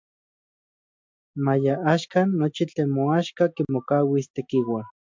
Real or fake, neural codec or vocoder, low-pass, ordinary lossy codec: real; none; 7.2 kHz; MP3, 64 kbps